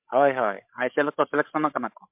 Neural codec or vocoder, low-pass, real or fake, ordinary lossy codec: codec, 16 kHz, 16 kbps, FreqCodec, larger model; 3.6 kHz; fake; MP3, 32 kbps